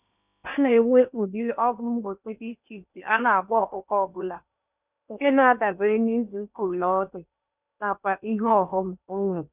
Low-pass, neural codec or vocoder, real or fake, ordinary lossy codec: 3.6 kHz; codec, 16 kHz in and 24 kHz out, 0.8 kbps, FocalCodec, streaming, 65536 codes; fake; none